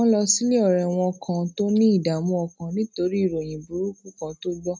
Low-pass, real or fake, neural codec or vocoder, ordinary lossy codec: none; real; none; none